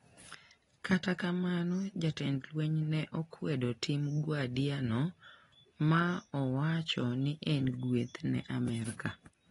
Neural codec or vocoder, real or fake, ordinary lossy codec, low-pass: none; real; AAC, 32 kbps; 10.8 kHz